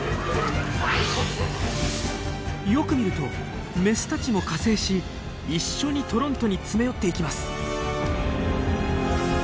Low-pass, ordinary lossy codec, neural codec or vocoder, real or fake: none; none; none; real